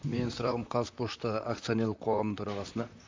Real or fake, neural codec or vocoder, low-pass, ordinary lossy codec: fake; vocoder, 44.1 kHz, 128 mel bands, Pupu-Vocoder; 7.2 kHz; MP3, 64 kbps